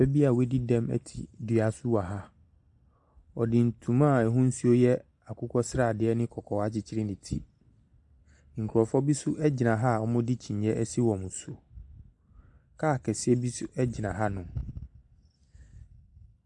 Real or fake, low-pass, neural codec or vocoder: real; 10.8 kHz; none